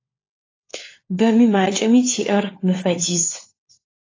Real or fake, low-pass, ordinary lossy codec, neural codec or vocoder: fake; 7.2 kHz; AAC, 32 kbps; codec, 16 kHz, 4 kbps, FunCodec, trained on LibriTTS, 50 frames a second